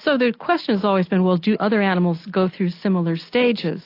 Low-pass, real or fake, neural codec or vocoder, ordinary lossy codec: 5.4 kHz; real; none; AAC, 32 kbps